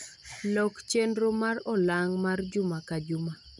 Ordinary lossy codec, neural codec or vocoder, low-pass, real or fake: none; none; 10.8 kHz; real